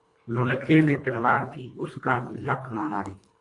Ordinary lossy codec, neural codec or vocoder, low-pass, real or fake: AAC, 48 kbps; codec, 24 kHz, 1.5 kbps, HILCodec; 10.8 kHz; fake